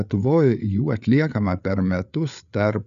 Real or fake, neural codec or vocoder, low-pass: fake; codec, 16 kHz, 8 kbps, FreqCodec, larger model; 7.2 kHz